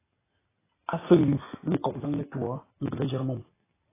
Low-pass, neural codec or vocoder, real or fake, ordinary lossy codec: 3.6 kHz; none; real; AAC, 16 kbps